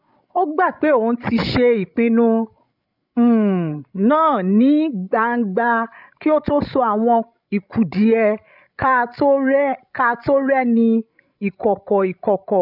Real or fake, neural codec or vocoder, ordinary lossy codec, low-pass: fake; codec, 16 kHz, 16 kbps, FreqCodec, larger model; none; 5.4 kHz